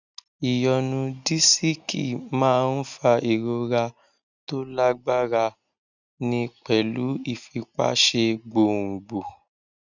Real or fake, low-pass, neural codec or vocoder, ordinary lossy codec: real; 7.2 kHz; none; none